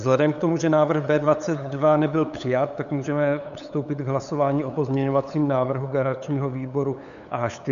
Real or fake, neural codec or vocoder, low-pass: fake; codec, 16 kHz, 8 kbps, FunCodec, trained on LibriTTS, 25 frames a second; 7.2 kHz